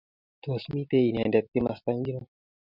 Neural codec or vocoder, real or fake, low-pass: none; real; 5.4 kHz